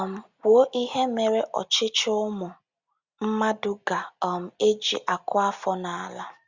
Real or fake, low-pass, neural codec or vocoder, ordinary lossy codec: real; 7.2 kHz; none; Opus, 64 kbps